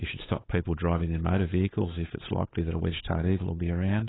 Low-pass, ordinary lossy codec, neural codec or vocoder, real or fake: 7.2 kHz; AAC, 16 kbps; codec, 16 kHz, 8 kbps, FunCodec, trained on Chinese and English, 25 frames a second; fake